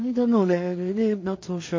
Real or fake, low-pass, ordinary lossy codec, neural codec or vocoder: fake; 7.2 kHz; MP3, 32 kbps; codec, 16 kHz in and 24 kHz out, 0.4 kbps, LongCat-Audio-Codec, two codebook decoder